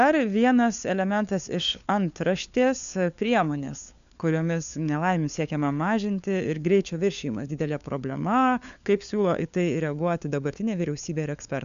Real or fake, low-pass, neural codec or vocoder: fake; 7.2 kHz; codec, 16 kHz, 4 kbps, FunCodec, trained on LibriTTS, 50 frames a second